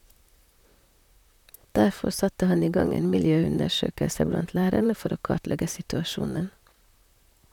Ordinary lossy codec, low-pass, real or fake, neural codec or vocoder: none; 19.8 kHz; fake; vocoder, 44.1 kHz, 128 mel bands, Pupu-Vocoder